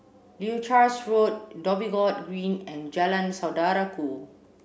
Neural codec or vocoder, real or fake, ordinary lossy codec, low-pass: none; real; none; none